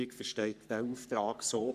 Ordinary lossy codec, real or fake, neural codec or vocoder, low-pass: none; fake; codec, 44.1 kHz, 3.4 kbps, Pupu-Codec; 14.4 kHz